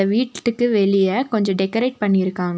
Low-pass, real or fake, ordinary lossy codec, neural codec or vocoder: none; real; none; none